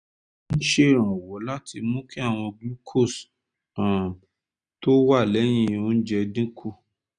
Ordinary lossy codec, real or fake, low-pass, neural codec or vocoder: none; real; 9.9 kHz; none